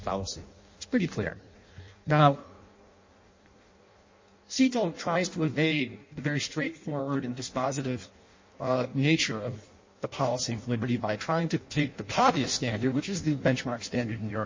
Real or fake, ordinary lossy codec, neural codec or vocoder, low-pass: fake; MP3, 32 kbps; codec, 16 kHz in and 24 kHz out, 0.6 kbps, FireRedTTS-2 codec; 7.2 kHz